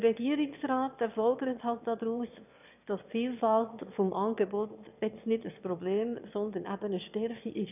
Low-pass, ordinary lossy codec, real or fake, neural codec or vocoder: 3.6 kHz; none; fake; autoencoder, 22.05 kHz, a latent of 192 numbers a frame, VITS, trained on one speaker